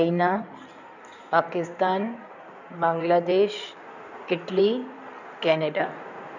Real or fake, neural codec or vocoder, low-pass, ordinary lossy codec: fake; codec, 16 kHz in and 24 kHz out, 2.2 kbps, FireRedTTS-2 codec; 7.2 kHz; none